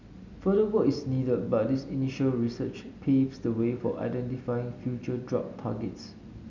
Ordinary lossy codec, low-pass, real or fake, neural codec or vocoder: none; 7.2 kHz; real; none